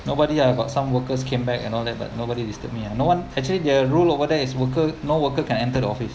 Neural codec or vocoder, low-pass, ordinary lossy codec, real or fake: none; none; none; real